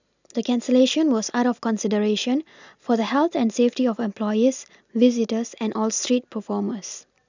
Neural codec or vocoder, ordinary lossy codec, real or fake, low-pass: none; none; real; 7.2 kHz